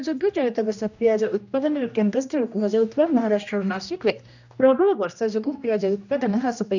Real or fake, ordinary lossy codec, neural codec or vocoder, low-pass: fake; none; codec, 16 kHz, 1 kbps, X-Codec, HuBERT features, trained on general audio; 7.2 kHz